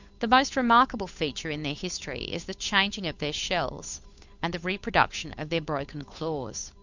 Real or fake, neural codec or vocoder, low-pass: fake; codec, 16 kHz, 8 kbps, FunCodec, trained on Chinese and English, 25 frames a second; 7.2 kHz